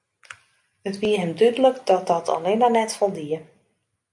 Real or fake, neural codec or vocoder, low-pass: real; none; 10.8 kHz